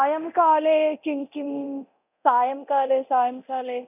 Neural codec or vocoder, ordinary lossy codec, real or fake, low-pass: codec, 24 kHz, 0.9 kbps, DualCodec; none; fake; 3.6 kHz